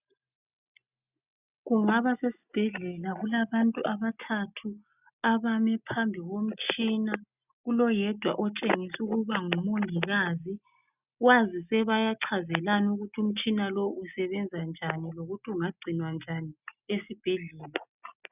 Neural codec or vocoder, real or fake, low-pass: none; real; 3.6 kHz